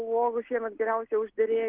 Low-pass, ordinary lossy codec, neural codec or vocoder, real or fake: 3.6 kHz; Opus, 16 kbps; none; real